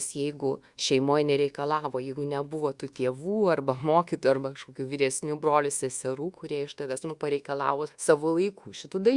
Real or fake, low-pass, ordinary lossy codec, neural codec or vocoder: fake; 10.8 kHz; Opus, 64 kbps; codec, 24 kHz, 1.2 kbps, DualCodec